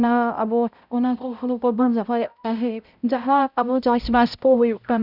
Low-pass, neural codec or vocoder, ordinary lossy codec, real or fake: 5.4 kHz; codec, 16 kHz, 0.5 kbps, X-Codec, HuBERT features, trained on balanced general audio; none; fake